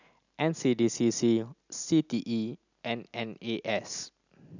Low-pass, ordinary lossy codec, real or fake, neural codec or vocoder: 7.2 kHz; none; real; none